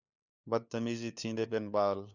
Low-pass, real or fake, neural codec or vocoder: 7.2 kHz; fake; codec, 16 kHz, 4 kbps, FunCodec, trained on LibriTTS, 50 frames a second